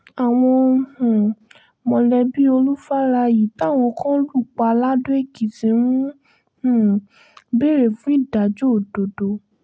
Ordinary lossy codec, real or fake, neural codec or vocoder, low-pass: none; real; none; none